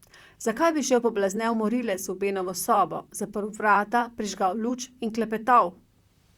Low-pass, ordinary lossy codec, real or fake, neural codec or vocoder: 19.8 kHz; Opus, 64 kbps; fake; vocoder, 44.1 kHz, 128 mel bands, Pupu-Vocoder